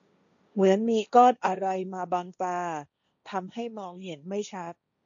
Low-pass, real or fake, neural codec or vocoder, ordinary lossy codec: 7.2 kHz; fake; codec, 16 kHz, 1.1 kbps, Voila-Tokenizer; none